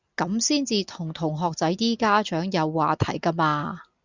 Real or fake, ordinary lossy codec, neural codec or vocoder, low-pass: real; Opus, 64 kbps; none; 7.2 kHz